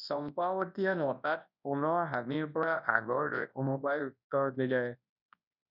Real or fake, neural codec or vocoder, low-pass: fake; codec, 24 kHz, 0.9 kbps, WavTokenizer, large speech release; 5.4 kHz